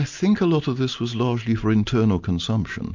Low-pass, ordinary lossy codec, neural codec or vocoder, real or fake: 7.2 kHz; MP3, 64 kbps; none; real